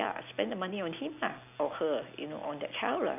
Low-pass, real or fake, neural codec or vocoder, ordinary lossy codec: 3.6 kHz; real; none; none